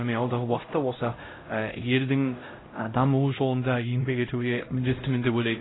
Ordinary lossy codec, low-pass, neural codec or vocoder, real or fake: AAC, 16 kbps; 7.2 kHz; codec, 16 kHz, 0.5 kbps, X-Codec, HuBERT features, trained on LibriSpeech; fake